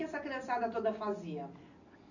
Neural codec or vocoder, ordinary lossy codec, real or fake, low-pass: none; none; real; 7.2 kHz